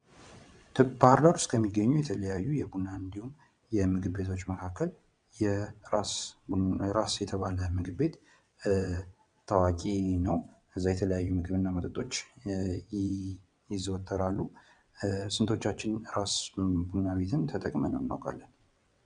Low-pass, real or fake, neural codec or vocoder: 9.9 kHz; fake; vocoder, 22.05 kHz, 80 mel bands, WaveNeXt